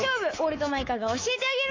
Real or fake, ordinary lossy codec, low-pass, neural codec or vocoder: fake; AAC, 32 kbps; 7.2 kHz; codec, 24 kHz, 3.1 kbps, DualCodec